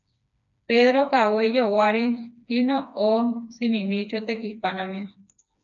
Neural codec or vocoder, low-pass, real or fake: codec, 16 kHz, 2 kbps, FreqCodec, smaller model; 7.2 kHz; fake